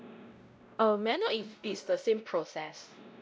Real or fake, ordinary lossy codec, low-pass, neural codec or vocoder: fake; none; none; codec, 16 kHz, 0.5 kbps, X-Codec, WavLM features, trained on Multilingual LibriSpeech